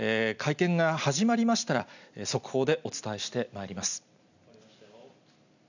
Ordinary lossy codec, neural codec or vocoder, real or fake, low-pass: none; none; real; 7.2 kHz